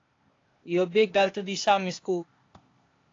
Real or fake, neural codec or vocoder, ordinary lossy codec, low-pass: fake; codec, 16 kHz, 0.8 kbps, ZipCodec; AAC, 32 kbps; 7.2 kHz